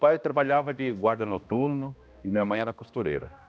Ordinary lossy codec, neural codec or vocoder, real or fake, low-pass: none; codec, 16 kHz, 1 kbps, X-Codec, HuBERT features, trained on balanced general audio; fake; none